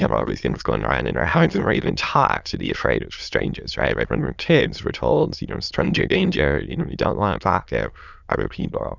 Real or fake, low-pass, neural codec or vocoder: fake; 7.2 kHz; autoencoder, 22.05 kHz, a latent of 192 numbers a frame, VITS, trained on many speakers